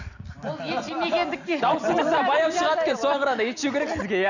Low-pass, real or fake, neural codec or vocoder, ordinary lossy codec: 7.2 kHz; real; none; none